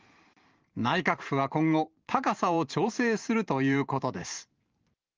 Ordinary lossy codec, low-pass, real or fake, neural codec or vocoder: Opus, 32 kbps; 7.2 kHz; fake; vocoder, 44.1 kHz, 128 mel bands every 512 samples, BigVGAN v2